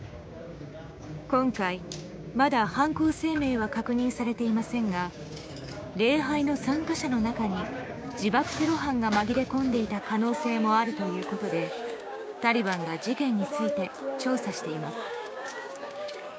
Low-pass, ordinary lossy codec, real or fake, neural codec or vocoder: none; none; fake; codec, 16 kHz, 6 kbps, DAC